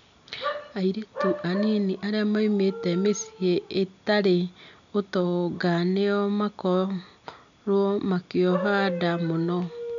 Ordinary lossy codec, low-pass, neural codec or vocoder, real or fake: none; 7.2 kHz; none; real